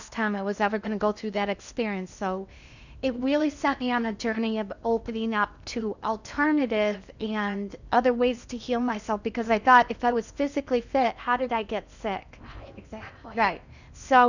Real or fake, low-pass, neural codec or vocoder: fake; 7.2 kHz; codec, 16 kHz in and 24 kHz out, 0.8 kbps, FocalCodec, streaming, 65536 codes